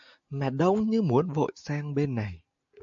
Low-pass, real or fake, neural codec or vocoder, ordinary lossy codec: 7.2 kHz; real; none; AAC, 64 kbps